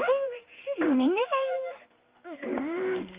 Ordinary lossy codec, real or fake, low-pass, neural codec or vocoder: Opus, 32 kbps; fake; 3.6 kHz; codec, 16 kHz in and 24 kHz out, 1 kbps, XY-Tokenizer